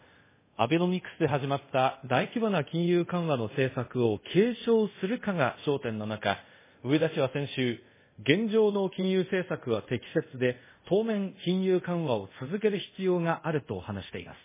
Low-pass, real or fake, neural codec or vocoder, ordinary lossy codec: 3.6 kHz; fake; codec, 24 kHz, 0.5 kbps, DualCodec; MP3, 16 kbps